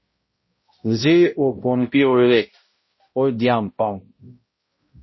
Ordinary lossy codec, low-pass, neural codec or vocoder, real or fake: MP3, 24 kbps; 7.2 kHz; codec, 16 kHz, 0.5 kbps, X-Codec, HuBERT features, trained on balanced general audio; fake